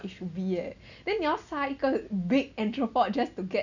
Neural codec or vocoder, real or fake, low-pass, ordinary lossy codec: none; real; 7.2 kHz; none